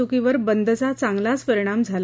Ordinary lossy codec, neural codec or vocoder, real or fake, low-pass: none; none; real; none